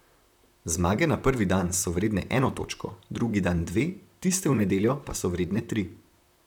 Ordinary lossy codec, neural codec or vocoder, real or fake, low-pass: none; vocoder, 44.1 kHz, 128 mel bands, Pupu-Vocoder; fake; 19.8 kHz